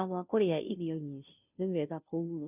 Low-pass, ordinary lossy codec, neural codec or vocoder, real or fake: 3.6 kHz; none; codec, 16 kHz, 0.5 kbps, FunCodec, trained on Chinese and English, 25 frames a second; fake